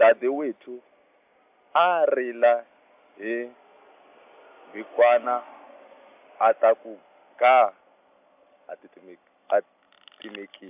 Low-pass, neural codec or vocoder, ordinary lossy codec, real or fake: 3.6 kHz; none; AAC, 32 kbps; real